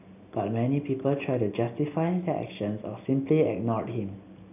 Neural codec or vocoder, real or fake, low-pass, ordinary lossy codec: none; real; 3.6 kHz; none